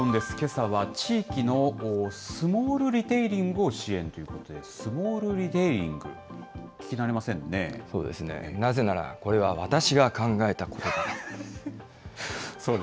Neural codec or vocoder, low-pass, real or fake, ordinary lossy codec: none; none; real; none